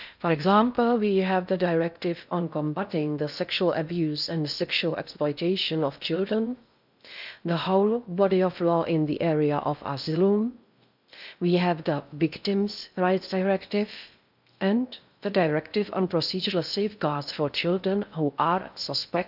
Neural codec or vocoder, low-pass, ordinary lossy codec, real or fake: codec, 16 kHz in and 24 kHz out, 0.6 kbps, FocalCodec, streaming, 2048 codes; 5.4 kHz; none; fake